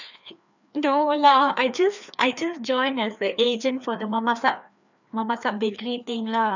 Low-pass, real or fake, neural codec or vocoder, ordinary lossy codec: 7.2 kHz; fake; codec, 16 kHz, 2 kbps, FreqCodec, larger model; none